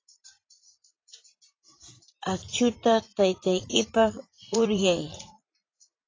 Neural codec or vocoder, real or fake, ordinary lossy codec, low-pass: vocoder, 44.1 kHz, 80 mel bands, Vocos; fake; AAC, 32 kbps; 7.2 kHz